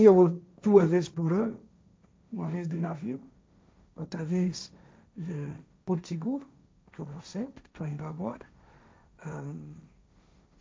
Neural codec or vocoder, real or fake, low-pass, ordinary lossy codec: codec, 16 kHz, 1.1 kbps, Voila-Tokenizer; fake; none; none